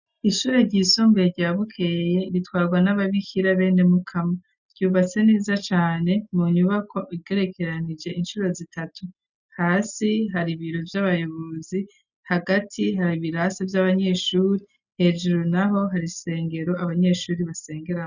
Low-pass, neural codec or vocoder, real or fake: 7.2 kHz; none; real